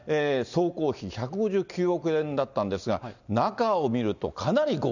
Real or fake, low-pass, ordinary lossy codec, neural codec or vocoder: real; 7.2 kHz; none; none